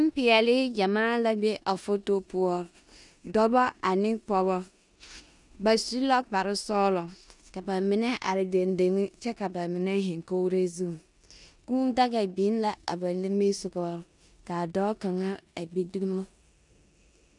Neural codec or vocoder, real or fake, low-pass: codec, 16 kHz in and 24 kHz out, 0.9 kbps, LongCat-Audio-Codec, four codebook decoder; fake; 10.8 kHz